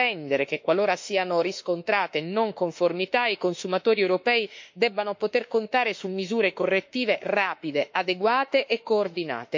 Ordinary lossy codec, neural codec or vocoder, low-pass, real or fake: MP3, 48 kbps; autoencoder, 48 kHz, 32 numbers a frame, DAC-VAE, trained on Japanese speech; 7.2 kHz; fake